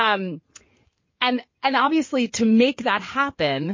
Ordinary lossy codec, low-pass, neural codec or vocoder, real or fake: MP3, 32 kbps; 7.2 kHz; codec, 16 kHz in and 24 kHz out, 2.2 kbps, FireRedTTS-2 codec; fake